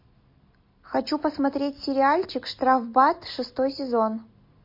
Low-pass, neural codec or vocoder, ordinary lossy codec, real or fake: 5.4 kHz; none; MP3, 32 kbps; real